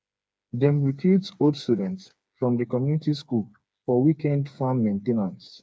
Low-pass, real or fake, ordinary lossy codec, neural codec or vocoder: none; fake; none; codec, 16 kHz, 4 kbps, FreqCodec, smaller model